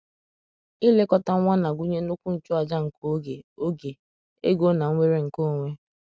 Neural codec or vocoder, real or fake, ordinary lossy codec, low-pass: none; real; none; none